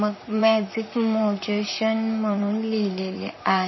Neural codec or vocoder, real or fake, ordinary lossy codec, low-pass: vocoder, 44.1 kHz, 128 mel bands, Pupu-Vocoder; fake; MP3, 24 kbps; 7.2 kHz